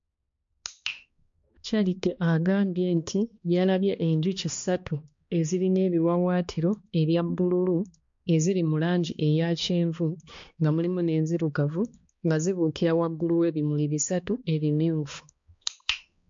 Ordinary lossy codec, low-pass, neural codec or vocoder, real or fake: MP3, 48 kbps; 7.2 kHz; codec, 16 kHz, 2 kbps, X-Codec, HuBERT features, trained on balanced general audio; fake